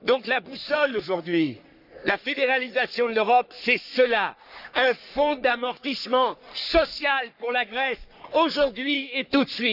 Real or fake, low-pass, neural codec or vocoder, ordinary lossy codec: fake; 5.4 kHz; codec, 44.1 kHz, 3.4 kbps, Pupu-Codec; none